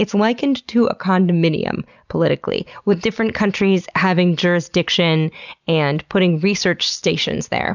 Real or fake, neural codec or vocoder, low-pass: real; none; 7.2 kHz